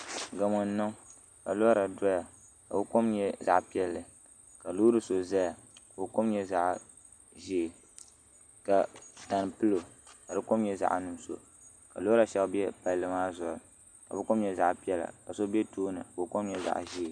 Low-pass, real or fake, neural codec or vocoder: 9.9 kHz; real; none